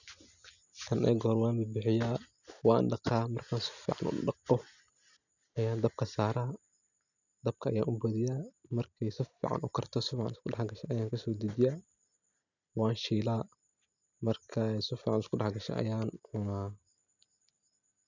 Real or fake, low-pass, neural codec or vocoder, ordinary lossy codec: real; 7.2 kHz; none; none